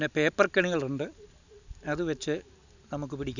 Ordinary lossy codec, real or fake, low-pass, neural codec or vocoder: none; real; 7.2 kHz; none